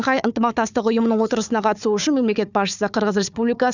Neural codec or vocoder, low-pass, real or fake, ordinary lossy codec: codec, 16 kHz, 4 kbps, FunCodec, trained on Chinese and English, 50 frames a second; 7.2 kHz; fake; none